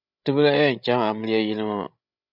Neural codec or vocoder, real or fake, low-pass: codec, 16 kHz, 16 kbps, FreqCodec, larger model; fake; 5.4 kHz